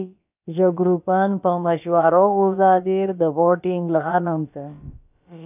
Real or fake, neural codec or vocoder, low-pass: fake; codec, 16 kHz, about 1 kbps, DyCAST, with the encoder's durations; 3.6 kHz